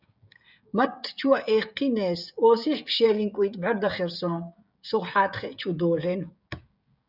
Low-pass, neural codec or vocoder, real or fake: 5.4 kHz; codec, 16 kHz, 16 kbps, FreqCodec, smaller model; fake